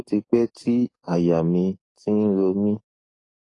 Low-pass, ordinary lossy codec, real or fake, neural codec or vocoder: 10.8 kHz; AAC, 32 kbps; fake; codec, 44.1 kHz, 7.8 kbps, DAC